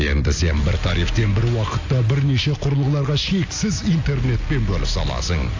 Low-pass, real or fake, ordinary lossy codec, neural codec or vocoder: 7.2 kHz; real; AAC, 48 kbps; none